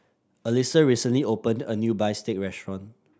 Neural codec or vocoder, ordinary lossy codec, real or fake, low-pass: none; none; real; none